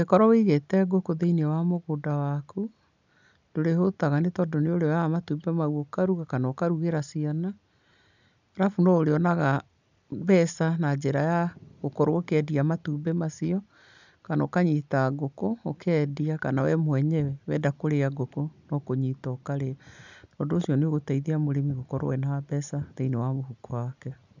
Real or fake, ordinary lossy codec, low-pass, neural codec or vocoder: real; none; 7.2 kHz; none